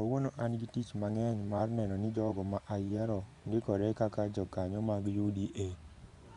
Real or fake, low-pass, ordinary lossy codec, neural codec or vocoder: fake; 10.8 kHz; none; vocoder, 24 kHz, 100 mel bands, Vocos